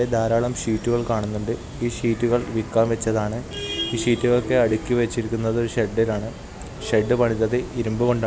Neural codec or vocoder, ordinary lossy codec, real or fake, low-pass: none; none; real; none